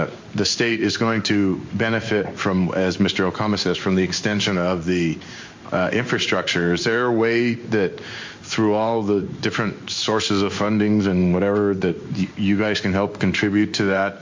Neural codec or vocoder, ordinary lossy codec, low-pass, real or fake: codec, 16 kHz in and 24 kHz out, 1 kbps, XY-Tokenizer; MP3, 48 kbps; 7.2 kHz; fake